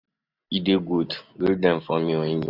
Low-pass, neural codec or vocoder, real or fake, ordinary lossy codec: 5.4 kHz; none; real; none